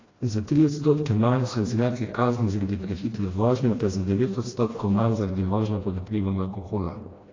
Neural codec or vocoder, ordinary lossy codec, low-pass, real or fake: codec, 16 kHz, 1 kbps, FreqCodec, smaller model; AAC, 32 kbps; 7.2 kHz; fake